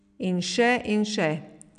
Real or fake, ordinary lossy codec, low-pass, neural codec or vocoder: real; none; 9.9 kHz; none